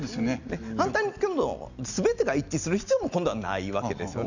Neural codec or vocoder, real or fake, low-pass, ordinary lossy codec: none; real; 7.2 kHz; none